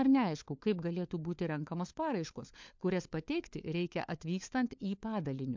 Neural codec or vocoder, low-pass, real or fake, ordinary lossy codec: codec, 16 kHz, 4 kbps, FunCodec, trained on LibriTTS, 50 frames a second; 7.2 kHz; fake; MP3, 64 kbps